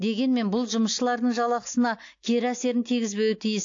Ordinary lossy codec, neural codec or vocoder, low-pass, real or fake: AAC, 48 kbps; none; 7.2 kHz; real